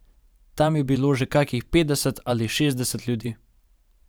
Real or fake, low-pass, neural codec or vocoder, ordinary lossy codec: fake; none; vocoder, 44.1 kHz, 128 mel bands every 512 samples, BigVGAN v2; none